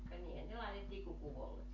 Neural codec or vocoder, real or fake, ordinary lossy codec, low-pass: none; real; none; 7.2 kHz